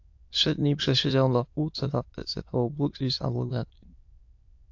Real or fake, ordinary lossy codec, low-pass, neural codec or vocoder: fake; AAC, 48 kbps; 7.2 kHz; autoencoder, 22.05 kHz, a latent of 192 numbers a frame, VITS, trained on many speakers